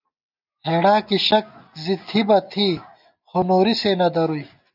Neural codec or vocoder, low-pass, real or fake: none; 5.4 kHz; real